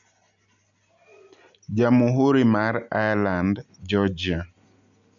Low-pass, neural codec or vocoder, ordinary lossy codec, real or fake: 7.2 kHz; none; none; real